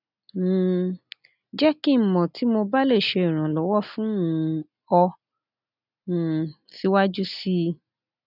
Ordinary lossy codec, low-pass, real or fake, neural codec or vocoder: none; 5.4 kHz; real; none